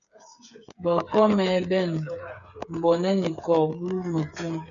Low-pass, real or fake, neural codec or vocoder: 7.2 kHz; fake; codec, 16 kHz, 8 kbps, FreqCodec, smaller model